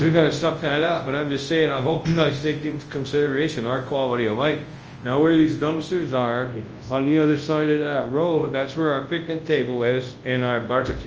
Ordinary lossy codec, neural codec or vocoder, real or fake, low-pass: Opus, 24 kbps; codec, 24 kHz, 0.9 kbps, WavTokenizer, large speech release; fake; 7.2 kHz